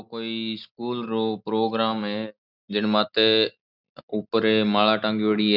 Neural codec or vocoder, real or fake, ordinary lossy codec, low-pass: none; real; none; 5.4 kHz